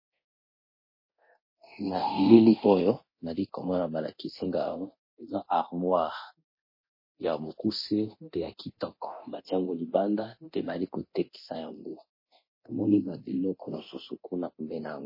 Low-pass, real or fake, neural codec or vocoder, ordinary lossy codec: 5.4 kHz; fake; codec, 24 kHz, 0.9 kbps, DualCodec; MP3, 24 kbps